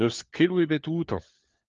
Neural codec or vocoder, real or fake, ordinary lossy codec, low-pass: codec, 16 kHz, 4 kbps, FunCodec, trained on LibriTTS, 50 frames a second; fake; Opus, 24 kbps; 7.2 kHz